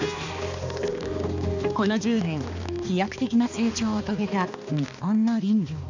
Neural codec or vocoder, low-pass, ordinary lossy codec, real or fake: codec, 16 kHz, 2 kbps, X-Codec, HuBERT features, trained on balanced general audio; 7.2 kHz; none; fake